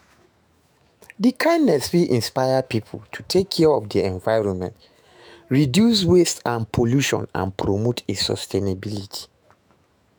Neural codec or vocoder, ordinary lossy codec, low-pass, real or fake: autoencoder, 48 kHz, 128 numbers a frame, DAC-VAE, trained on Japanese speech; none; none; fake